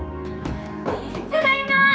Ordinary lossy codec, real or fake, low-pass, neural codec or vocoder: none; fake; none; codec, 16 kHz, 4 kbps, X-Codec, HuBERT features, trained on general audio